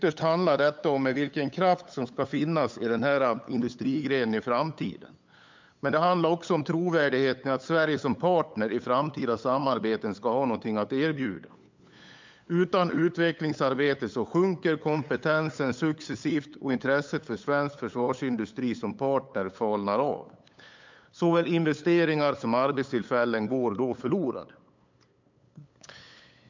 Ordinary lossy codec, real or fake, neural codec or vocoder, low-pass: MP3, 64 kbps; fake; codec, 16 kHz, 8 kbps, FunCodec, trained on LibriTTS, 25 frames a second; 7.2 kHz